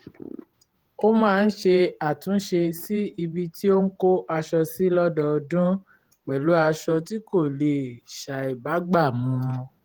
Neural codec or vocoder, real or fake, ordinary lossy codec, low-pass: vocoder, 48 kHz, 128 mel bands, Vocos; fake; Opus, 24 kbps; 19.8 kHz